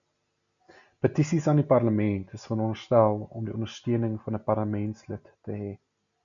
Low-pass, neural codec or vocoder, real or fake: 7.2 kHz; none; real